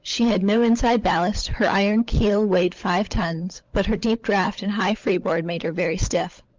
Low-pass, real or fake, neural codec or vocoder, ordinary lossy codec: 7.2 kHz; fake; codec, 16 kHz, 16 kbps, FunCodec, trained on LibriTTS, 50 frames a second; Opus, 24 kbps